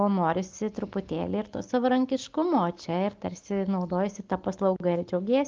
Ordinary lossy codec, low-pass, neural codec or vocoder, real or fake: Opus, 24 kbps; 7.2 kHz; none; real